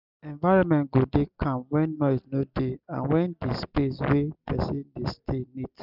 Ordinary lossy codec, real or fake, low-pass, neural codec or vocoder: none; real; 5.4 kHz; none